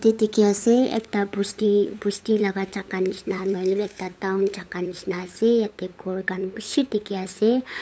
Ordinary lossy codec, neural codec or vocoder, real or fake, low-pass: none; codec, 16 kHz, 8 kbps, FunCodec, trained on LibriTTS, 25 frames a second; fake; none